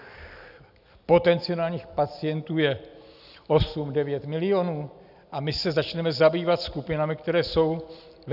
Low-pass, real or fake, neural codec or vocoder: 5.4 kHz; real; none